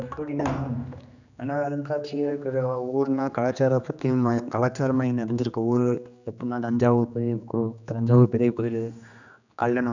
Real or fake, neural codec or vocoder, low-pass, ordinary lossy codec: fake; codec, 16 kHz, 2 kbps, X-Codec, HuBERT features, trained on general audio; 7.2 kHz; none